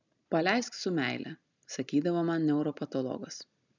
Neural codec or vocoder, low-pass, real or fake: none; 7.2 kHz; real